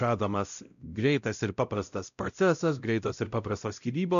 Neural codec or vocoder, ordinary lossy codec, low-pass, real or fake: codec, 16 kHz, 0.5 kbps, X-Codec, WavLM features, trained on Multilingual LibriSpeech; AAC, 96 kbps; 7.2 kHz; fake